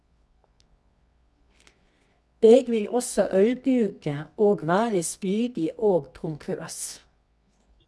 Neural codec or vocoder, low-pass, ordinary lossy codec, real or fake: codec, 24 kHz, 0.9 kbps, WavTokenizer, medium music audio release; none; none; fake